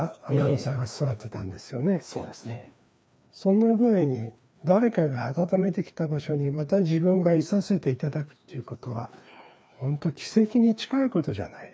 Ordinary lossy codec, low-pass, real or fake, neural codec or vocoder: none; none; fake; codec, 16 kHz, 2 kbps, FreqCodec, larger model